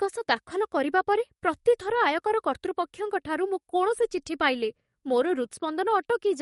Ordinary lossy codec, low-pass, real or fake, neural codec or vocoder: MP3, 48 kbps; 19.8 kHz; fake; codec, 44.1 kHz, 7.8 kbps, DAC